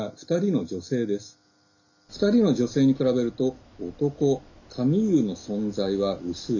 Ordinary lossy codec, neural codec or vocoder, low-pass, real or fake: none; none; 7.2 kHz; real